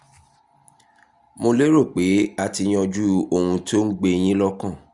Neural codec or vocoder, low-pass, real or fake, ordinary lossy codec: none; 10.8 kHz; real; Opus, 64 kbps